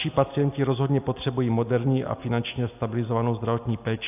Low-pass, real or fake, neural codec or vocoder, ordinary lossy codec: 3.6 kHz; real; none; AAC, 32 kbps